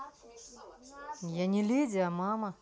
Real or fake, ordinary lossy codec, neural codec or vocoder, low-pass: real; none; none; none